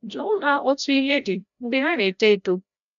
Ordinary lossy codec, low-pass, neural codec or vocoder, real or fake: none; 7.2 kHz; codec, 16 kHz, 0.5 kbps, FreqCodec, larger model; fake